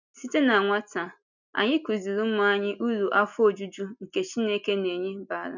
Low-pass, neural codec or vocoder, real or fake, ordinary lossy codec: 7.2 kHz; none; real; none